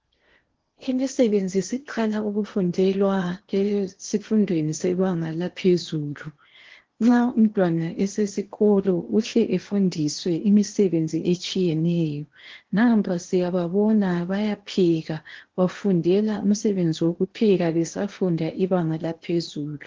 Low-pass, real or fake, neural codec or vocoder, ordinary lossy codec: 7.2 kHz; fake; codec, 16 kHz in and 24 kHz out, 0.8 kbps, FocalCodec, streaming, 65536 codes; Opus, 16 kbps